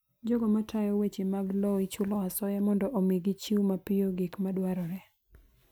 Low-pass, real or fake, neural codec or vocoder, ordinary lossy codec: none; real; none; none